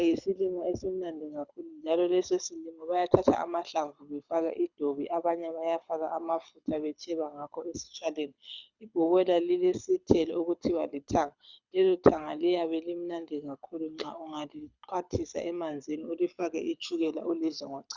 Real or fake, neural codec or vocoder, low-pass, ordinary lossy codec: fake; codec, 24 kHz, 6 kbps, HILCodec; 7.2 kHz; Opus, 64 kbps